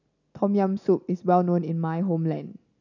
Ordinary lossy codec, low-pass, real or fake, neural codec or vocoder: none; 7.2 kHz; real; none